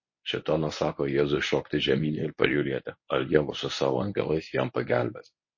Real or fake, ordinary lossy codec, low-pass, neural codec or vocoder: fake; MP3, 32 kbps; 7.2 kHz; codec, 24 kHz, 0.9 kbps, WavTokenizer, medium speech release version 1